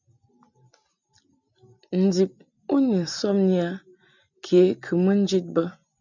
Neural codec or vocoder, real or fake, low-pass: none; real; 7.2 kHz